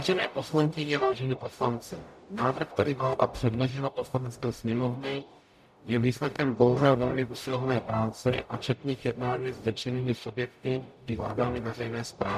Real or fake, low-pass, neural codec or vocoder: fake; 14.4 kHz; codec, 44.1 kHz, 0.9 kbps, DAC